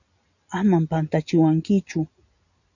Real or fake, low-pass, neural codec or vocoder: real; 7.2 kHz; none